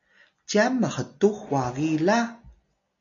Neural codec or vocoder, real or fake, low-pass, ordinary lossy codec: none; real; 7.2 kHz; AAC, 64 kbps